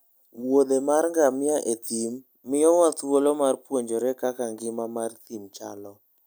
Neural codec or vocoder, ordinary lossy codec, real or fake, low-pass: none; none; real; none